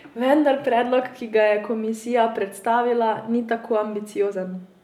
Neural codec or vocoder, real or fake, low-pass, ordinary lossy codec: none; real; 19.8 kHz; none